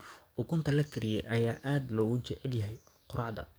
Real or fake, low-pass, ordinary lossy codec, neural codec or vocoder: fake; none; none; codec, 44.1 kHz, 7.8 kbps, DAC